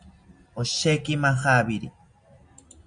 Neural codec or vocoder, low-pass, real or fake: none; 9.9 kHz; real